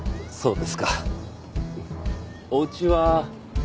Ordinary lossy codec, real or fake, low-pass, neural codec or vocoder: none; real; none; none